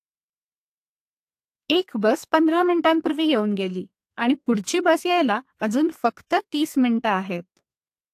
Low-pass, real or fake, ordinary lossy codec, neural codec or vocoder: 14.4 kHz; fake; AAC, 64 kbps; codec, 32 kHz, 1.9 kbps, SNAC